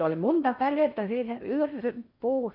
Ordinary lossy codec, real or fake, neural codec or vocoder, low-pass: none; fake; codec, 16 kHz in and 24 kHz out, 0.6 kbps, FocalCodec, streaming, 4096 codes; 5.4 kHz